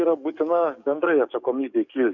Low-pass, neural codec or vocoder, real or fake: 7.2 kHz; codec, 44.1 kHz, 7.8 kbps, DAC; fake